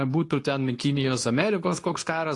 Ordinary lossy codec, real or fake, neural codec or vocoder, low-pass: AAC, 48 kbps; fake; codec, 24 kHz, 0.9 kbps, WavTokenizer, medium speech release version 2; 10.8 kHz